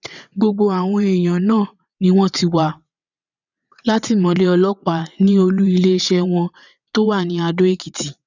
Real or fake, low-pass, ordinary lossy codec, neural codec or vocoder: fake; 7.2 kHz; none; vocoder, 22.05 kHz, 80 mel bands, WaveNeXt